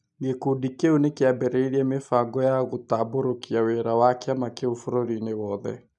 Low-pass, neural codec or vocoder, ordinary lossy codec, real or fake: 10.8 kHz; none; none; real